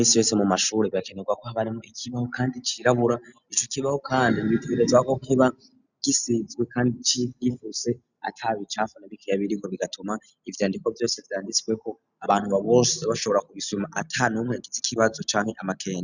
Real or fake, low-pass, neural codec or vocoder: real; 7.2 kHz; none